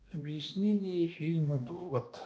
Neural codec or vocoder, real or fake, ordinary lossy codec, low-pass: codec, 16 kHz, 1 kbps, X-Codec, HuBERT features, trained on balanced general audio; fake; none; none